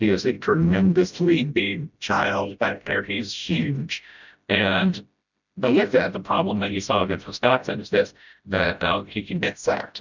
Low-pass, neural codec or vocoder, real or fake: 7.2 kHz; codec, 16 kHz, 0.5 kbps, FreqCodec, smaller model; fake